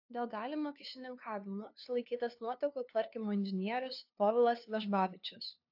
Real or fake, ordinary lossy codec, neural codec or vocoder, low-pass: fake; AAC, 48 kbps; codec, 16 kHz, 2 kbps, FunCodec, trained on LibriTTS, 25 frames a second; 5.4 kHz